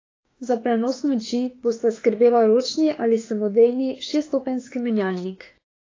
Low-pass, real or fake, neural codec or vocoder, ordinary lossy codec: 7.2 kHz; fake; autoencoder, 48 kHz, 32 numbers a frame, DAC-VAE, trained on Japanese speech; AAC, 32 kbps